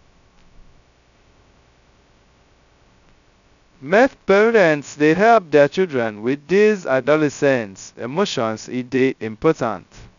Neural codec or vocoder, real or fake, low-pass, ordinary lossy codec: codec, 16 kHz, 0.2 kbps, FocalCodec; fake; 7.2 kHz; none